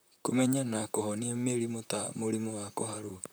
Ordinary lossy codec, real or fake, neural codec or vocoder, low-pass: none; fake; vocoder, 44.1 kHz, 128 mel bands, Pupu-Vocoder; none